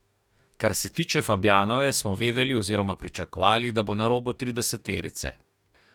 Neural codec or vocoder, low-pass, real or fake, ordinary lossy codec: codec, 44.1 kHz, 2.6 kbps, DAC; 19.8 kHz; fake; none